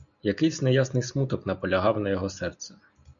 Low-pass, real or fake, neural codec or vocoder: 7.2 kHz; real; none